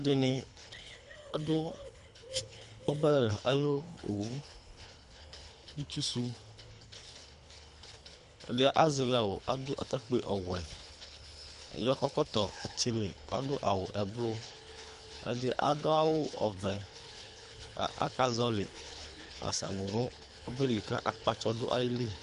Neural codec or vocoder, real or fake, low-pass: codec, 24 kHz, 3 kbps, HILCodec; fake; 10.8 kHz